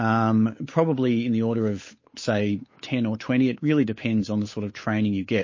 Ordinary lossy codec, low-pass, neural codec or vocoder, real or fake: MP3, 32 kbps; 7.2 kHz; codec, 16 kHz, 8 kbps, FunCodec, trained on Chinese and English, 25 frames a second; fake